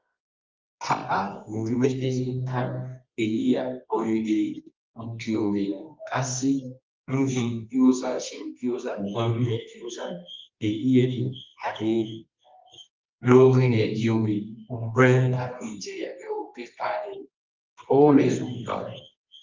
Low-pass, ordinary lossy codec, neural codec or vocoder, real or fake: 7.2 kHz; Opus, 32 kbps; codec, 24 kHz, 0.9 kbps, WavTokenizer, medium music audio release; fake